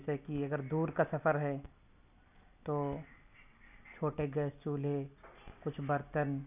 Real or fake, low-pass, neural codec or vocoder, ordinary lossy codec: real; 3.6 kHz; none; none